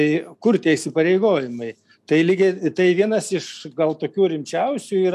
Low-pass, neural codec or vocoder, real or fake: 14.4 kHz; none; real